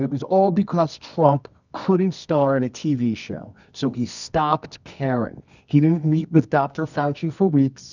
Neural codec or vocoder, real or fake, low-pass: codec, 24 kHz, 0.9 kbps, WavTokenizer, medium music audio release; fake; 7.2 kHz